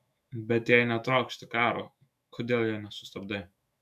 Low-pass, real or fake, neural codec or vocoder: 14.4 kHz; fake; autoencoder, 48 kHz, 128 numbers a frame, DAC-VAE, trained on Japanese speech